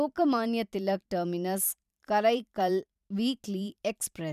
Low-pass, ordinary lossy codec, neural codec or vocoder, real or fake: 14.4 kHz; none; vocoder, 44.1 kHz, 128 mel bands every 512 samples, BigVGAN v2; fake